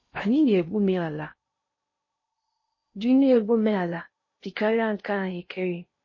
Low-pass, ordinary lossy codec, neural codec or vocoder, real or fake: 7.2 kHz; MP3, 32 kbps; codec, 16 kHz in and 24 kHz out, 0.6 kbps, FocalCodec, streaming, 4096 codes; fake